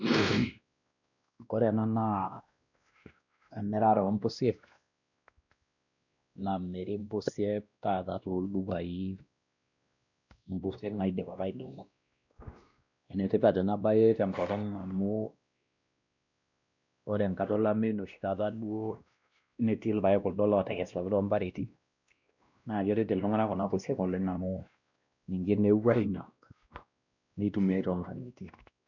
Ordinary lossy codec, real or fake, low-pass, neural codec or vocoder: none; fake; 7.2 kHz; codec, 16 kHz, 1 kbps, X-Codec, WavLM features, trained on Multilingual LibriSpeech